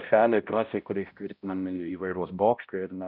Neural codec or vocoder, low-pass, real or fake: codec, 16 kHz, 0.5 kbps, X-Codec, HuBERT features, trained on balanced general audio; 5.4 kHz; fake